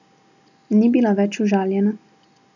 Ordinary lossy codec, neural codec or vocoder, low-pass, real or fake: none; none; 7.2 kHz; real